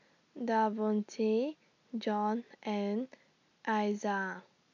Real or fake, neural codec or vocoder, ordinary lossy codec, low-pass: real; none; none; 7.2 kHz